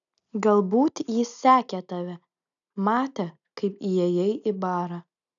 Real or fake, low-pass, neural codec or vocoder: real; 7.2 kHz; none